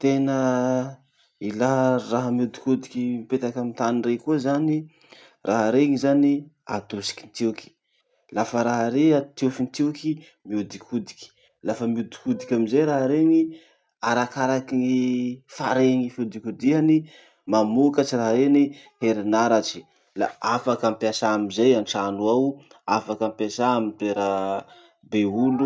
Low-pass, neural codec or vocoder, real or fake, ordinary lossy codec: none; none; real; none